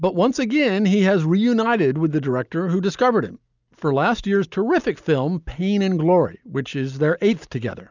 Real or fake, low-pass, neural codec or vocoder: real; 7.2 kHz; none